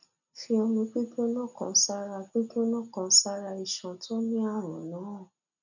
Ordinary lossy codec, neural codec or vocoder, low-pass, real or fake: none; none; 7.2 kHz; real